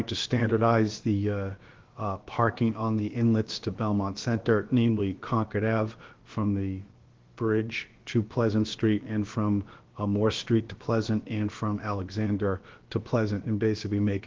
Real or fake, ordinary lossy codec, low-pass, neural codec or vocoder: fake; Opus, 16 kbps; 7.2 kHz; codec, 16 kHz, about 1 kbps, DyCAST, with the encoder's durations